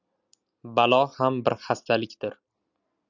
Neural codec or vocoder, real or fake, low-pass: none; real; 7.2 kHz